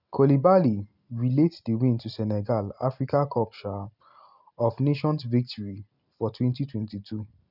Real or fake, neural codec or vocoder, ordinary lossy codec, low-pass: real; none; none; 5.4 kHz